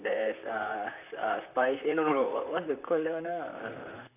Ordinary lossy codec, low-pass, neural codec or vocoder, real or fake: none; 3.6 kHz; vocoder, 44.1 kHz, 128 mel bands, Pupu-Vocoder; fake